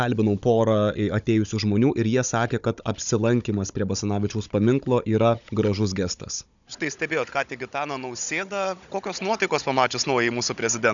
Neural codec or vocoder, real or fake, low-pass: codec, 16 kHz, 16 kbps, FunCodec, trained on Chinese and English, 50 frames a second; fake; 7.2 kHz